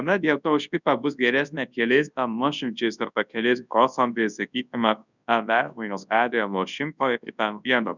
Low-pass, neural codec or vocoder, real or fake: 7.2 kHz; codec, 24 kHz, 0.9 kbps, WavTokenizer, large speech release; fake